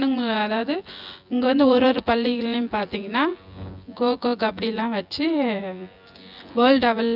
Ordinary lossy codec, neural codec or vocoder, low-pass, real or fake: none; vocoder, 24 kHz, 100 mel bands, Vocos; 5.4 kHz; fake